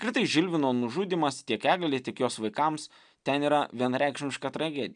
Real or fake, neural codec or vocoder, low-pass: real; none; 9.9 kHz